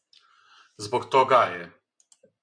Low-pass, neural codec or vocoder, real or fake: 9.9 kHz; none; real